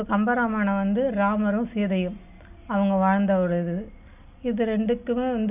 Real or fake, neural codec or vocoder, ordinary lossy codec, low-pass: real; none; none; 3.6 kHz